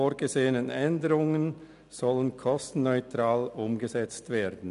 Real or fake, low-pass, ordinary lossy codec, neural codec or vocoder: real; 10.8 kHz; none; none